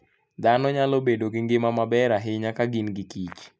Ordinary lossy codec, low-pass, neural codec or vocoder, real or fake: none; none; none; real